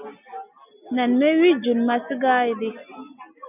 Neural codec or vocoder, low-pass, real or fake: none; 3.6 kHz; real